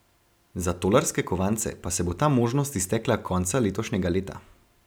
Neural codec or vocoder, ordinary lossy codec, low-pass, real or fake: none; none; none; real